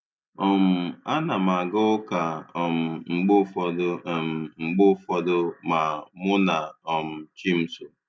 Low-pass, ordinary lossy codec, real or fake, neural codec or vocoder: none; none; real; none